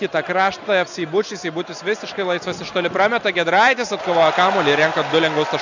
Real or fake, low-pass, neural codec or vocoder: real; 7.2 kHz; none